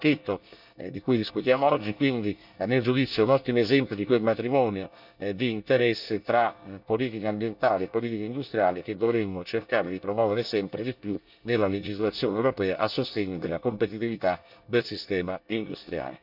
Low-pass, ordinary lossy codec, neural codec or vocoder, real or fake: 5.4 kHz; none; codec, 24 kHz, 1 kbps, SNAC; fake